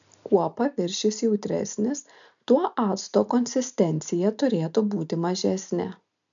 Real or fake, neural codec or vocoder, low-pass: real; none; 7.2 kHz